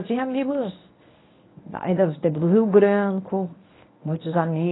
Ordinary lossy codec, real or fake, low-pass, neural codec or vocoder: AAC, 16 kbps; fake; 7.2 kHz; codec, 24 kHz, 0.9 kbps, WavTokenizer, medium speech release version 1